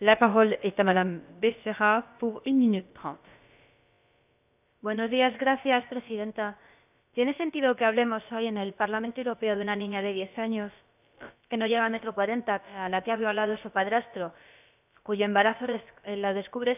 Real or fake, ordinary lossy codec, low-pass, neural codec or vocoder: fake; none; 3.6 kHz; codec, 16 kHz, about 1 kbps, DyCAST, with the encoder's durations